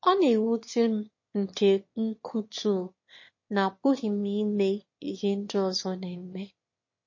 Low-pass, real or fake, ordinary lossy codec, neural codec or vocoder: 7.2 kHz; fake; MP3, 32 kbps; autoencoder, 22.05 kHz, a latent of 192 numbers a frame, VITS, trained on one speaker